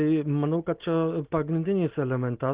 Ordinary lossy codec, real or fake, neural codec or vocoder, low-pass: Opus, 16 kbps; real; none; 3.6 kHz